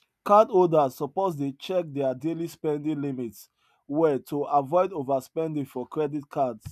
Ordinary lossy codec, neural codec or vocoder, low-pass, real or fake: none; none; 14.4 kHz; real